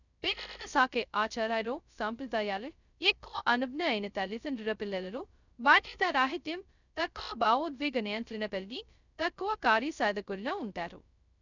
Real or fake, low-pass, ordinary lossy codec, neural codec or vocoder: fake; 7.2 kHz; none; codec, 16 kHz, 0.2 kbps, FocalCodec